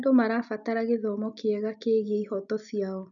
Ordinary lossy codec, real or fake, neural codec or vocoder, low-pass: none; real; none; 7.2 kHz